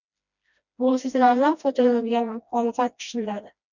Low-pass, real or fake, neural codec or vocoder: 7.2 kHz; fake; codec, 16 kHz, 1 kbps, FreqCodec, smaller model